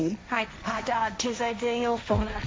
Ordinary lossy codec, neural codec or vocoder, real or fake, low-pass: none; codec, 16 kHz, 1.1 kbps, Voila-Tokenizer; fake; none